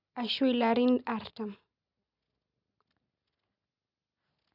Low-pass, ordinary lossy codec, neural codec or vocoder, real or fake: 5.4 kHz; none; none; real